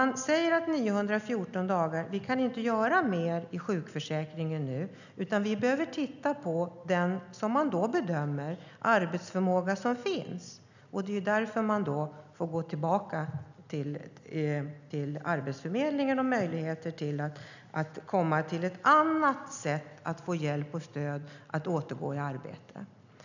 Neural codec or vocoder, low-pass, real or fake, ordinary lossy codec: none; 7.2 kHz; real; none